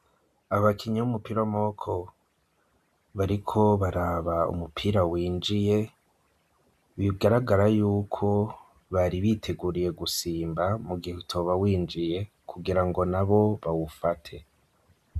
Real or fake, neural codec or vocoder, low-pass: real; none; 14.4 kHz